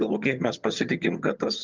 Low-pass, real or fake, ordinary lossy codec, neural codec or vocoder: 7.2 kHz; fake; Opus, 24 kbps; vocoder, 22.05 kHz, 80 mel bands, HiFi-GAN